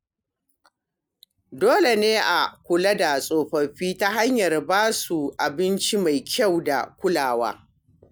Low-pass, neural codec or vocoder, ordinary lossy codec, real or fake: none; none; none; real